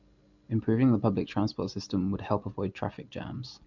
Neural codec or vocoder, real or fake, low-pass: none; real; 7.2 kHz